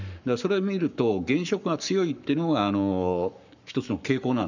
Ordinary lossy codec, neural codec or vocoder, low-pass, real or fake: none; codec, 44.1 kHz, 7.8 kbps, Pupu-Codec; 7.2 kHz; fake